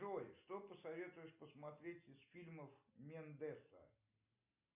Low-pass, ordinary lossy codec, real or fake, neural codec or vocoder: 3.6 kHz; MP3, 32 kbps; real; none